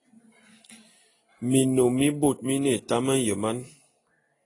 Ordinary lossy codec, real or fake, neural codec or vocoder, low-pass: AAC, 32 kbps; real; none; 10.8 kHz